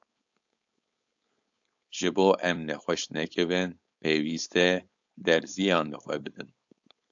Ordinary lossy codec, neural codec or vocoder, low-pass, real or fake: AAC, 64 kbps; codec, 16 kHz, 4.8 kbps, FACodec; 7.2 kHz; fake